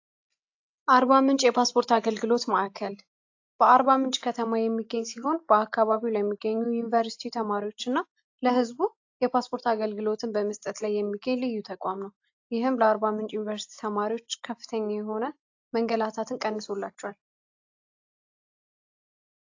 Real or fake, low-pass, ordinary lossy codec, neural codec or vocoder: real; 7.2 kHz; AAC, 48 kbps; none